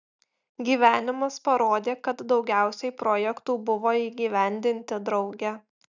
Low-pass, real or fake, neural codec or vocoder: 7.2 kHz; real; none